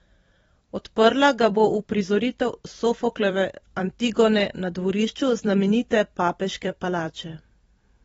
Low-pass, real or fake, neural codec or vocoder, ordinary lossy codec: 19.8 kHz; fake; vocoder, 44.1 kHz, 128 mel bands every 256 samples, BigVGAN v2; AAC, 24 kbps